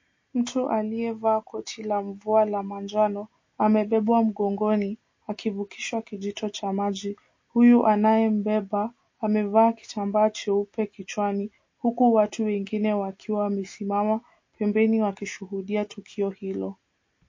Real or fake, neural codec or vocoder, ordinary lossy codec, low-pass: real; none; MP3, 32 kbps; 7.2 kHz